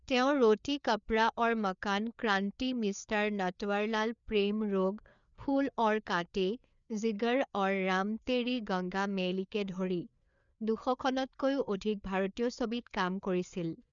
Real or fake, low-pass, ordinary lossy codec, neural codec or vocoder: fake; 7.2 kHz; none; codec, 16 kHz, 4 kbps, FreqCodec, larger model